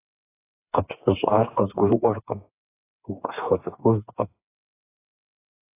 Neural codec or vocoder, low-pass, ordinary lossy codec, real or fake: codec, 16 kHz, 4 kbps, FreqCodec, smaller model; 3.6 kHz; AAC, 24 kbps; fake